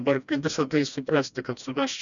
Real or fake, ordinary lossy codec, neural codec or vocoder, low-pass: fake; MP3, 96 kbps; codec, 16 kHz, 1 kbps, FreqCodec, smaller model; 7.2 kHz